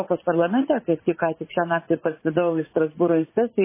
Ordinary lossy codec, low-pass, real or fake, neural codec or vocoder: MP3, 16 kbps; 3.6 kHz; fake; vocoder, 44.1 kHz, 80 mel bands, Vocos